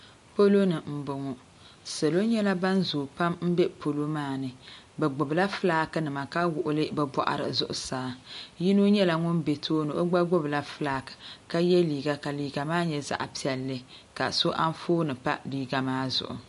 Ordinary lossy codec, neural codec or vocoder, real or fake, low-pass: MP3, 48 kbps; none; real; 14.4 kHz